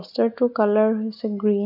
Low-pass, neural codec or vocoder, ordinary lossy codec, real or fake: 5.4 kHz; none; none; real